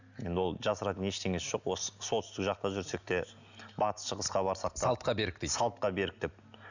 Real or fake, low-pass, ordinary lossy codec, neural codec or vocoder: real; 7.2 kHz; none; none